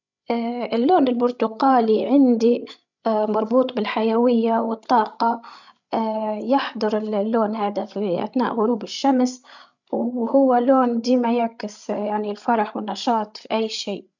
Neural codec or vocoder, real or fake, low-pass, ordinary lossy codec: codec, 16 kHz, 16 kbps, FreqCodec, larger model; fake; 7.2 kHz; none